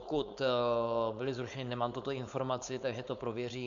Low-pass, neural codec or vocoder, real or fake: 7.2 kHz; codec, 16 kHz, 4.8 kbps, FACodec; fake